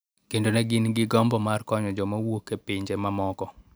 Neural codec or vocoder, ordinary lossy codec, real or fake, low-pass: none; none; real; none